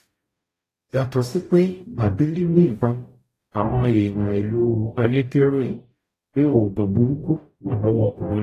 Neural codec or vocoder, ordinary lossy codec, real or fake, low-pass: codec, 44.1 kHz, 0.9 kbps, DAC; AAC, 48 kbps; fake; 14.4 kHz